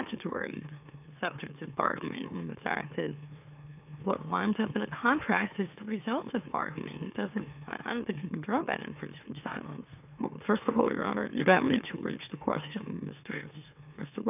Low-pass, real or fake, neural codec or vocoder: 3.6 kHz; fake; autoencoder, 44.1 kHz, a latent of 192 numbers a frame, MeloTTS